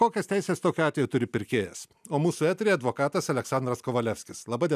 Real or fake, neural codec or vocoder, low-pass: real; none; 14.4 kHz